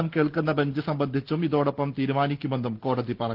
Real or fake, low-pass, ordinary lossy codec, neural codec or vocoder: real; 5.4 kHz; Opus, 16 kbps; none